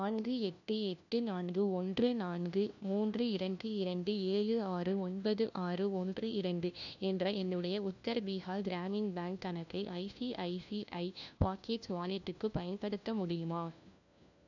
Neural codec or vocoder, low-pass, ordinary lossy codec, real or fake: codec, 16 kHz, 1 kbps, FunCodec, trained on LibriTTS, 50 frames a second; 7.2 kHz; none; fake